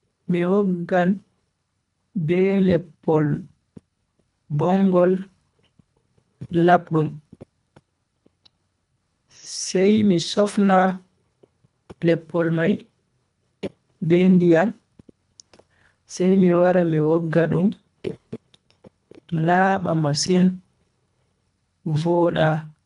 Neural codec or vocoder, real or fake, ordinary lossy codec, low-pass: codec, 24 kHz, 1.5 kbps, HILCodec; fake; none; 10.8 kHz